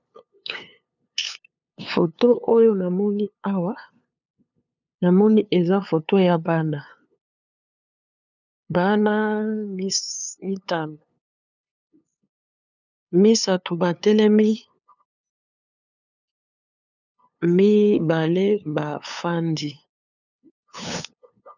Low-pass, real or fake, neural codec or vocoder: 7.2 kHz; fake; codec, 16 kHz, 2 kbps, FunCodec, trained on LibriTTS, 25 frames a second